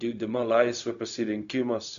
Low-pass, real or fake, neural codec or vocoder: 7.2 kHz; fake; codec, 16 kHz, 0.4 kbps, LongCat-Audio-Codec